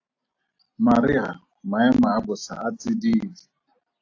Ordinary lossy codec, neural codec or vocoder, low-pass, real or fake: AAC, 48 kbps; none; 7.2 kHz; real